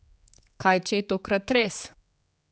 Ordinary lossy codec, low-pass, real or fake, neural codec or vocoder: none; none; fake; codec, 16 kHz, 4 kbps, X-Codec, HuBERT features, trained on general audio